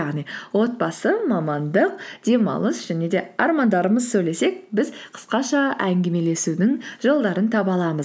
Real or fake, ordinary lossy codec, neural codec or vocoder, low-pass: real; none; none; none